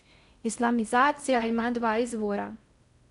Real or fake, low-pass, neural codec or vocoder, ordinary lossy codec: fake; 10.8 kHz; codec, 16 kHz in and 24 kHz out, 0.6 kbps, FocalCodec, streaming, 4096 codes; none